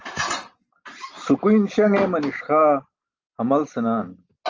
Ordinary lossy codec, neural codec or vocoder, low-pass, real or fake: Opus, 24 kbps; none; 7.2 kHz; real